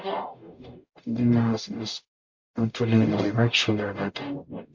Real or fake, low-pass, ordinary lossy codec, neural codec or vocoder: fake; 7.2 kHz; MP3, 48 kbps; codec, 44.1 kHz, 0.9 kbps, DAC